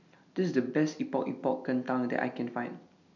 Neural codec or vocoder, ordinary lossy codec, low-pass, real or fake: none; none; 7.2 kHz; real